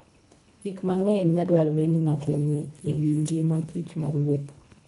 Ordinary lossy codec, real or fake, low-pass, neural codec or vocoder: none; fake; 10.8 kHz; codec, 24 kHz, 1.5 kbps, HILCodec